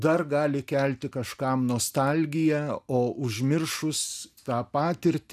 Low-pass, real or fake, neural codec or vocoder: 14.4 kHz; real; none